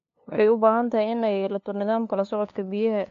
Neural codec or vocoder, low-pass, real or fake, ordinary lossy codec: codec, 16 kHz, 2 kbps, FunCodec, trained on LibriTTS, 25 frames a second; 7.2 kHz; fake; AAC, 48 kbps